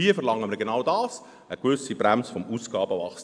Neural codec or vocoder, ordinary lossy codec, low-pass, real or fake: none; AAC, 64 kbps; 9.9 kHz; real